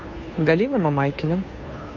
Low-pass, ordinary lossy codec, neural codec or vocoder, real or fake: 7.2 kHz; MP3, 48 kbps; codec, 16 kHz, 2 kbps, FunCodec, trained on Chinese and English, 25 frames a second; fake